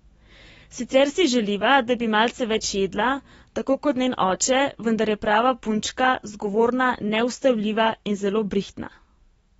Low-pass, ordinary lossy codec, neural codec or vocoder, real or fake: 19.8 kHz; AAC, 24 kbps; autoencoder, 48 kHz, 128 numbers a frame, DAC-VAE, trained on Japanese speech; fake